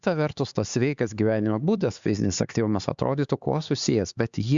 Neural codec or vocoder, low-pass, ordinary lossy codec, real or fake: codec, 16 kHz, 4 kbps, X-Codec, HuBERT features, trained on LibriSpeech; 7.2 kHz; Opus, 64 kbps; fake